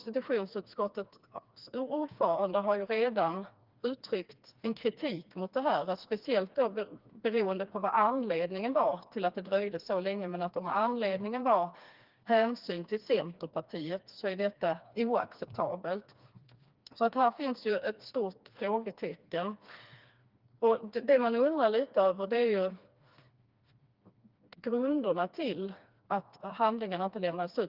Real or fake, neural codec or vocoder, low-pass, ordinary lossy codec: fake; codec, 16 kHz, 2 kbps, FreqCodec, smaller model; 5.4 kHz; Opus, 32 kbps